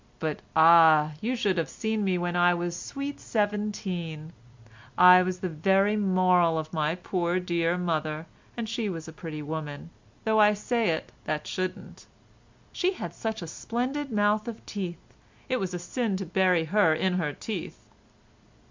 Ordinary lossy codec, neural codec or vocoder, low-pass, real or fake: MP3, 64 kbps; none; 7.2 kHz; real